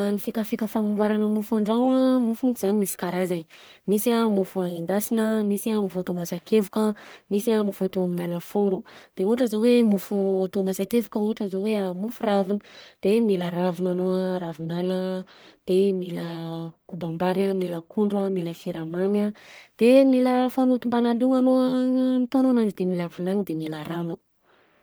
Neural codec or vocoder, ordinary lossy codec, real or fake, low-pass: codec, 44.1 kHz, 1.7 kbps, Pupu-Codec; none; fake; none